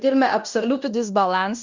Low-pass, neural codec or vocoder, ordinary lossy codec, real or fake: 7.2 kHz; codec, 16 kHz in and 24 kHz out, 0.9 kbps, LongCat-Audio-Codec, fine tuned four codebook decoder; Opus, 64 kbps; fake